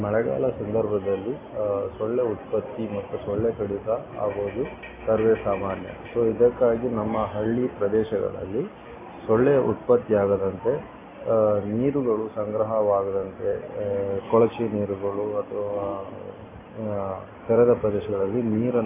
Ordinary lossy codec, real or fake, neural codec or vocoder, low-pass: AAC, 16 kbps; real; none; 3.6 kHz